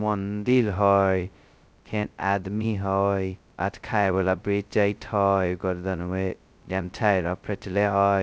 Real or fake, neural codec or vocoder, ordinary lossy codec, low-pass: fake; codec, 16 kHz, 0.2 kbps, FocalCodec; none; none